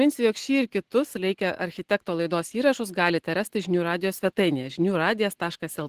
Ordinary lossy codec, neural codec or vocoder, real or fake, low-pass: Opus, 16 kbps; none; real; 14.4 kHz